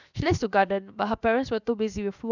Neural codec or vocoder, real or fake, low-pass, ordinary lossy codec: codec, 16 kHz, 0.7 kbps, FocalCodec; fake; 7.2 kHz; none